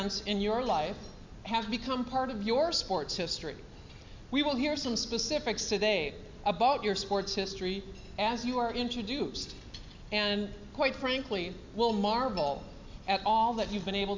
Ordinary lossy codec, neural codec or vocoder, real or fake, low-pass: MP3, 64 kbps; none; real; 7.2 kHz